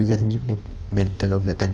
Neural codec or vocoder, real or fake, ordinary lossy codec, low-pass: codec, 16 kHz in and 24 kHz out, 1.1 kbps, FireRedTTS-2 codec; fake; none; 9.9 kHz